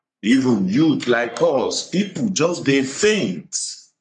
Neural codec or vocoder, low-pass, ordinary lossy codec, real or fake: codec, 44.1 kHz, 3.4 kbps, Pupu-Codec; 10.8 kHz; none; fake